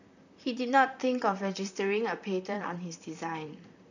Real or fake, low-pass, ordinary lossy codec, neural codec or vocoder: fake; 7.2 kHz; none; vocoder, 44.1 kHz, 128 mel bands, Pupu-Vocoder